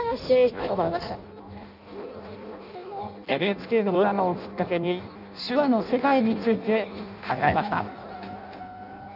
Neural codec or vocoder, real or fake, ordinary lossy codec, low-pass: codec, 16 kHz in and 24 kHz out, 0.6 kbps, FireRedTTS-2 codec; fake; none; 5.4 kHz